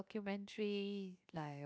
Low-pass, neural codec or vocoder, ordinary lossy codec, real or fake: none; codec, 16 kHz, 0.7 kbps, FocalCodec; none; fake